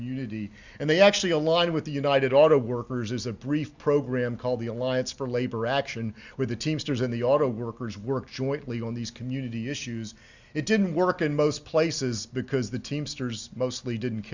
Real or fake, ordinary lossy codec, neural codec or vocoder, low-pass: real; Opus, 64 kbps; none; 7.2 kHz